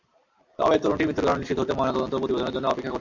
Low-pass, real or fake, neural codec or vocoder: 7.2 kHz; real; none